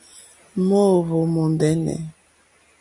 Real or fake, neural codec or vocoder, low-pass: real; none; 10.8 kHz